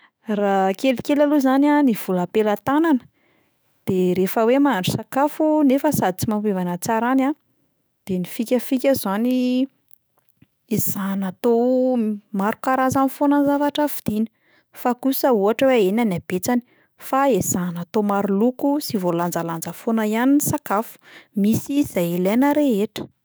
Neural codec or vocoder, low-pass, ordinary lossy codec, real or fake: autoencoder, 48 kHz, 128 numbers a frame, DAC-VAE, trained on Japanese speech; none; none; fake